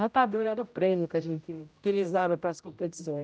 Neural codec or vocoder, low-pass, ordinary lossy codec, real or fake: codec, 16 kHz, 0.5 kbps, X-Codec, HuBERT features, trained on general audio; none; none; fake